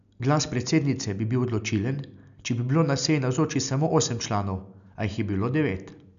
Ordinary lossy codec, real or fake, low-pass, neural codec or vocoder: none; real; 7.2 kHz; none